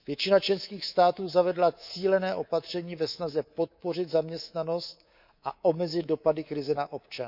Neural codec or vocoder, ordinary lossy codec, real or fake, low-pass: codec, 24 kHz, 3.1 kbps, DualCodec; none; fake; 5.4 kHz